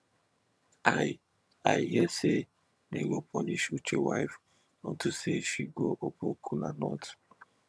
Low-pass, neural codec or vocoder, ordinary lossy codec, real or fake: none; vocoder, 22.05 kHz, 80 mel bands, HiFi-GAN; none; fake